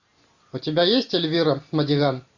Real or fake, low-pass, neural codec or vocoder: real; 7.2 kHz; none